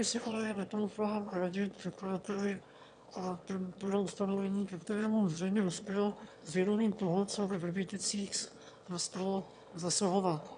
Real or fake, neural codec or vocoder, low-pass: fake; autoencoder, 22.05 kHz, a latent of 192 numbers a frame, VITS, trained on one speaker; 9.9 kHz